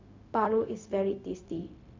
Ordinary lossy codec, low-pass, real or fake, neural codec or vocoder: MP3, 64 kbps; 7.2 kHz; fake; codec, 16 kHz, 0.4 kbps, LongCat-Audio-Codec